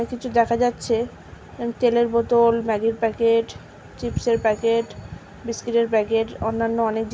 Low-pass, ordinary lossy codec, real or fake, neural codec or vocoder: none; none; real; none